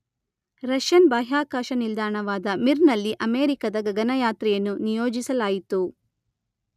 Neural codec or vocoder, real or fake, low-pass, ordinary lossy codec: none; real; 14.4 kHz; none